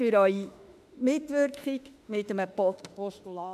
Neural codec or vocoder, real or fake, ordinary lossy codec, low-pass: autoencoder, 48 kHz, 32 numbers a frame, DAC-VAE, trained on Japanese speech; fake; MP3, 96 kbps; 14.4 kHz